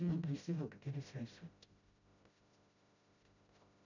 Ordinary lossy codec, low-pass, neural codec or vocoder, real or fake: none; 7.2 kHz; codec, 16 kHz, 0.5 kbps, FreqCodec, smaller model; fake